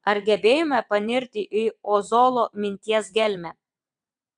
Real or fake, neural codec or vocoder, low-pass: fake; vocoder, 22.05 kHz, 80 mel bands, WaveNeXt; 9.9 kHz